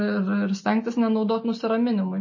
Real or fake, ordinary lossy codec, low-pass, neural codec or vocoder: real; MP3, 32 kbps; 7.2 kHz; none